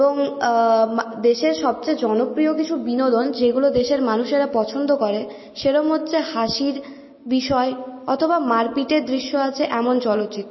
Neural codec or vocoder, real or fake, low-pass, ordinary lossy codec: none; real; 7.2 kHz; MP3, 24 kbps